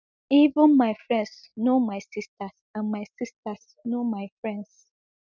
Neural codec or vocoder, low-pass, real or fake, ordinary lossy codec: none; 7.2 kHz; real; none